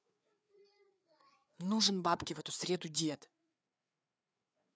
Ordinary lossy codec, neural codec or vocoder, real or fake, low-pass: none; codec, 16 kHz, 4 kbps, FreqCodec, larger model; fake; none